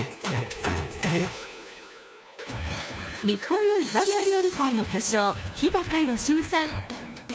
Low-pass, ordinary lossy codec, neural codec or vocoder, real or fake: none; none; codec, 16 kHz, 1 kbps, FunCodec, trained on LibriTTS, 50 frames a second; fake